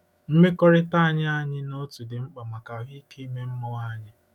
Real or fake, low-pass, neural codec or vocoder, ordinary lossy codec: fake; 19.8 kHz; autoencoder, 48 kHz, 128 numbers a frame, DAC-VAE, trained on Japanese speech; none